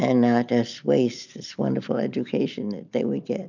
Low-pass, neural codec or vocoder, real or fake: 7.2 kHz; none; real